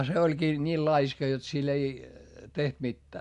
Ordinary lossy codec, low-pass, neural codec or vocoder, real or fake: MP3, 64 kbps; 10.8 kHz; none; real